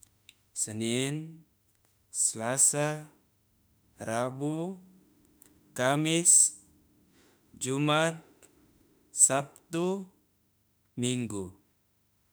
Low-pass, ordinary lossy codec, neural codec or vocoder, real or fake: none; none; autoencoder, 48 kHz, 32 numbers a frame, DAC-VAE, trained on Japanese speech; fake